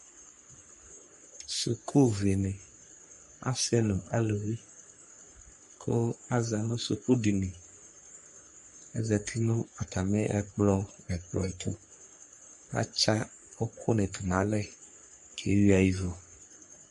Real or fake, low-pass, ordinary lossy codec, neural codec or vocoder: fake; 14.4 kHz; MP3, 48 kbps; codec, 44.1 kHz, 3.4 kbps, Pupu-Codec